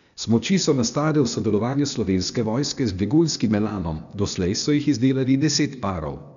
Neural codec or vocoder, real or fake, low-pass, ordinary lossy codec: codec, 16 kHz, 0.8 kbps, ZipCodec; fake; 7.2 kHz; none